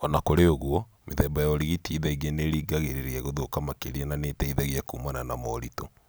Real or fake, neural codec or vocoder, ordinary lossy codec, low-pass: real; none; none; none